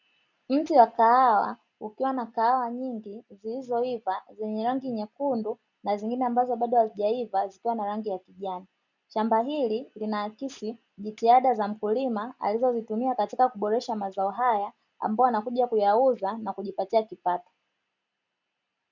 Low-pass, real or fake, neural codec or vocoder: 7.2 kHz; real; none